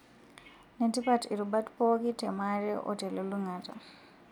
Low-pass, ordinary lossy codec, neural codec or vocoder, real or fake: 19.8 kHz; none; none; real